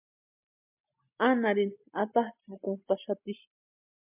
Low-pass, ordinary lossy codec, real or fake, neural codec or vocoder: 3.6 kHz; MP3, 32 kbps; real; none